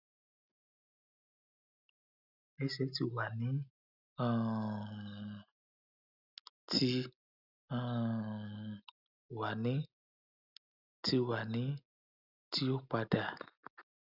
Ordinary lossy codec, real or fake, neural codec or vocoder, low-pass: none; real; none; 5.4 kHz